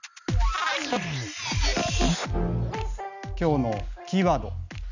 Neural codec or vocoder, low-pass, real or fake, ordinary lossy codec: none; 7.2 kHz; real; none